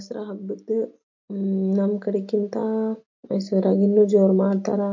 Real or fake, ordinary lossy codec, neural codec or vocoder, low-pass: real; MP3, 48 kbps; none; 7.2 kHz